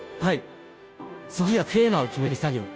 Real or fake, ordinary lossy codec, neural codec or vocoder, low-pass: fake; none; codec, 16 kHz, 0.5 kbps, FunCodec, trained on Chinese and English, 25 frames a second; none